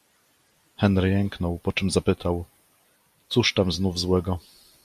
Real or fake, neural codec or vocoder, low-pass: real; none; 14.4 kHz